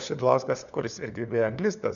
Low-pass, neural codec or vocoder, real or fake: 7.2 kHz; codec, 16 kHz, 4 kbps, FunCodec, trained on LibriTTS, 50 frames a second; fake